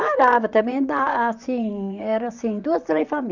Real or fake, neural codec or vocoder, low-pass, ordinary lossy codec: fake; vocoder, 44.1 kHz, 128 mel bands, Pupu-Vocoder; 7.2 kHz; none